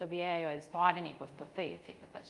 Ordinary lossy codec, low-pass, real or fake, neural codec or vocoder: Opus, 32 kbps; 10.8 kHz; fake; codec, 24 kHz, 0.5 kbps, DualCodec